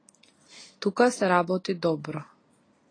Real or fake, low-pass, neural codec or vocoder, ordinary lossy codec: real; 9.9 kHz; none; AAC, 32 kbps